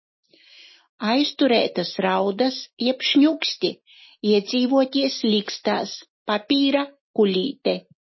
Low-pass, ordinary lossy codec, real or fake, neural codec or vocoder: 7.2 kHz; MP3, 24 kbps; real; none